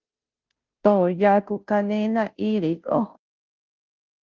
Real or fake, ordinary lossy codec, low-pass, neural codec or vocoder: fake; Opus, 32 kbps; 7.2 kHz; codec, 16 kHz, 0.5 kbps, FunCodec, trained on Chinese and English, 25 frames a second